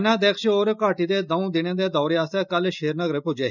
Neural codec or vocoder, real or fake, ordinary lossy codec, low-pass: none; real; none; 7.2 kHz